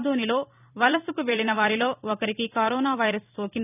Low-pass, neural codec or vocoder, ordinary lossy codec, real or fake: 3.6 kHz; vocoder, 44.1 kHz, 128 mel bands every 256 samples, BigVGAN v2; none; fake